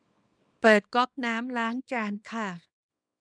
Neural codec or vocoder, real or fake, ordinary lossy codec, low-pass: codec, 24 kHz, 0.9 kbps, WavTokenizer, small release; fake; none; 9.9 kHz